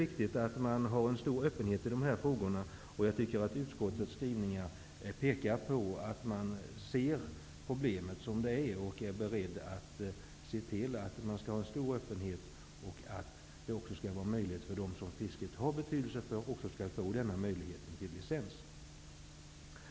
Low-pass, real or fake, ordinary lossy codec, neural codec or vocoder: none; real; none; none